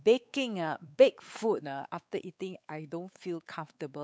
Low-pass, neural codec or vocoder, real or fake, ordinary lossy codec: none; codec, 16 kHz, 4 kbps, X-Codec, WavLM features, trained on Multilingual LibriSpeech; fake; none